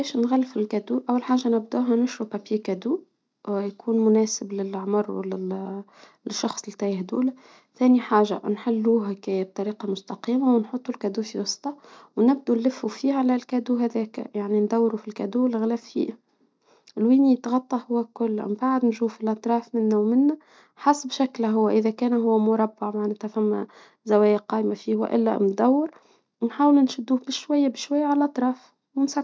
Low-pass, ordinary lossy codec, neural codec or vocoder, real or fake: none; none; none; real